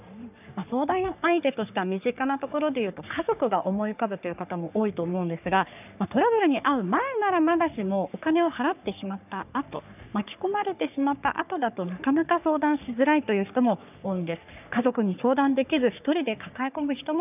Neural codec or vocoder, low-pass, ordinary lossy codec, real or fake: codec, 44.1 kHz, 3.4 kbps, Pupu-Codec; 3.6 kHz; none; fake